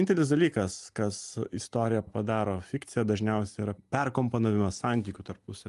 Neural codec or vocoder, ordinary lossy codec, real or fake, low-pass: none; Opus, 32 kbps; real; 10.8 kHz